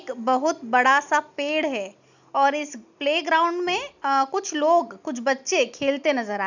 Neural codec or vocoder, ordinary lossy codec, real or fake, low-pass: none; none; real; 7.2 kHz